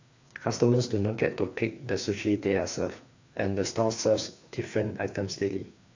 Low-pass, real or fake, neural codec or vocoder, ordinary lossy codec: 7.2 kHz; fake; codec, 16 kHz, 2 kbps, FreqCodec, larger model; AAC, 48 kbps